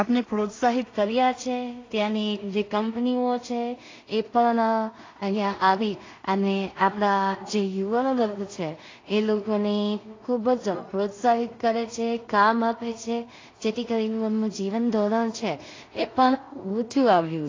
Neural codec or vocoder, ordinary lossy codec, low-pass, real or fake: codec, 16 kHz in and 24 kHz out, 0.4 kbps, LongCat-Audio-Codec, two codebook decoder; AAC, 32 kbps; 7.2 kHz; fake